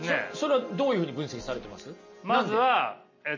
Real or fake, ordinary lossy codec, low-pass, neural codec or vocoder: real; MP3, 32 kbps; 7.2 kHz; none